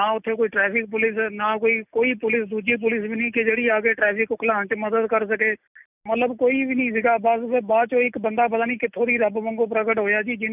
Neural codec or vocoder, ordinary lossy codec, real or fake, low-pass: none; none; real; 3.6 kHz